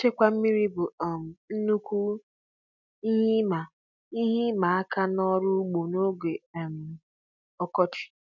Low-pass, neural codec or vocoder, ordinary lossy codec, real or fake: 7.2 kHz; none; none; real